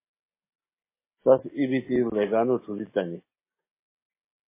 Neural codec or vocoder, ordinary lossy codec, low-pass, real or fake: none; MP3, 16 kbps; 3.6 kHz; real